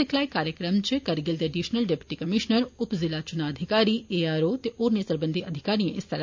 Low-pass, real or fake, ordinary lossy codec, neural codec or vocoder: 7.2 kHz; real; MP3, 48 kbps; none